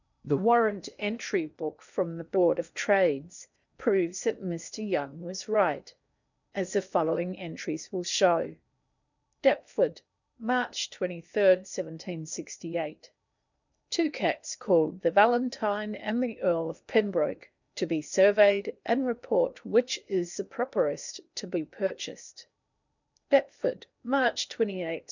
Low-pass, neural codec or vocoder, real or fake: 7.2 kHz; codec, 16 kHz in and 24 kHz out, 0.8 kbps, FocalCodec, streaming, 65536 codes; fake